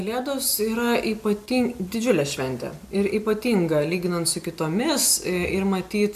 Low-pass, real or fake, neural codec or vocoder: 14.4 kHz; real; none